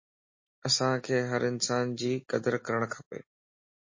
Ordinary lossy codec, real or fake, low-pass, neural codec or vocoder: MP3, 32 kbps; real; 7.2 kHz; none